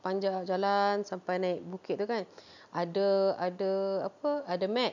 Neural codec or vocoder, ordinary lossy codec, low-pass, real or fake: none; none; 7.2 kHz; real